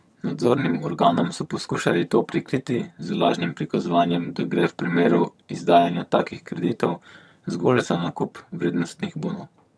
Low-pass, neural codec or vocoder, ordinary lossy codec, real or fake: none; vocoder, 22.05 kHz, 80 mel bands, HiFi-GAN; none; fake